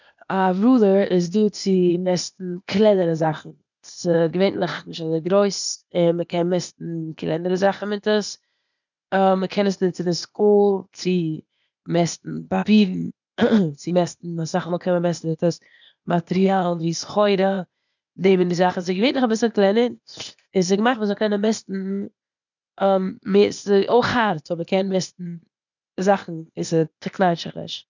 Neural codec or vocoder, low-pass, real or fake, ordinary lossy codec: codec, 16 kHz, 0.8 kbps, ZipCodec; 7.2 kHz; fake; none